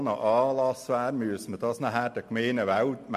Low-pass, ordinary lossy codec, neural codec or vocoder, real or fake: 14.4 kHz; MP3, 64 kbps; none; real